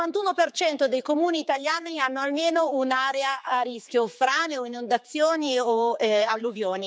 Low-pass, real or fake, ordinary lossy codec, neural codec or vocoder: none; fake; none; codec, 16 kHz, 4 kbps, X-Codec, HuBERT features, trained on general audio